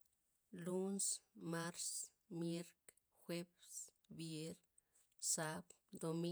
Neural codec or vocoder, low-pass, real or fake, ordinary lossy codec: vocoder, 48 kHz, 128 mel bands, Vocos; none; fake; none